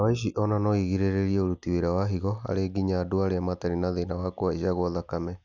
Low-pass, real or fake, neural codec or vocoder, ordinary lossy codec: 7.2 kHz; real; none; none